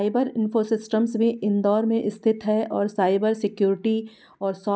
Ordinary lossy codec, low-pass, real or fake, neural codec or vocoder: none; none; real; none